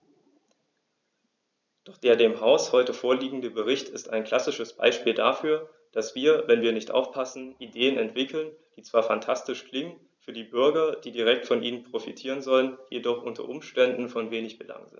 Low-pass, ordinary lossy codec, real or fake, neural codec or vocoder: 7.2 kHz; none; fake; vocoder, 44.1 kHz, 128 mel bands every 256 samples, BigVGAN v2